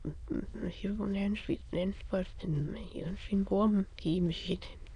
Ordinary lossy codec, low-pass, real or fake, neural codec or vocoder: none; 9.9 kHz; fake; autoencoder, 22.05 kHz, a latent of 192 numbers a frame, VITS, trained on many speakers